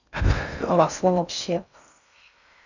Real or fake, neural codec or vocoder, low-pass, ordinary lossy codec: fake; codec, 16 kHz in and 24 kHz out, 0.6 kbps, FocalCodec, streaming, 4096 codes; 7.2 kHz; none